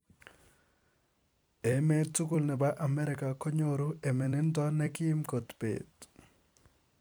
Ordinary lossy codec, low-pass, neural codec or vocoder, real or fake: none; none; vocoder, 44.1 kHz, 128 mel bands every 256 samples, BigVGAN v2; fake